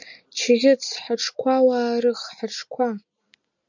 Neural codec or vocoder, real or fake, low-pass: none; real; 7.2 kHz